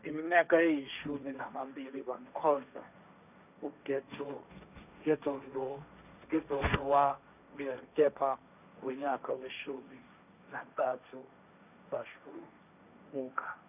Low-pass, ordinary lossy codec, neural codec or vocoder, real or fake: 3.6 kHz; none; codec, 16 kHz, 1.1 kbps, Voila-Tokenizer; fake